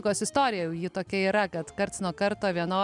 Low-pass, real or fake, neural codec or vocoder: 10.8 kHz; real; none